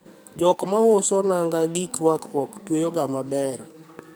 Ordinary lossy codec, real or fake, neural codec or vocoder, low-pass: none; fake; codec, 44.1 kHz, 2.6 kbps, SNAC; none